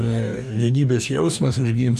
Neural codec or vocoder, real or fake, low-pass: codec, 44.1 kHz, 2.6 kbps, DAC; fake; 14.4 kHz